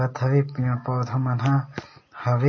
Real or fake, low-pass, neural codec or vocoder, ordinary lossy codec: real; 7.2 kHz; none; MP3, 32 kbps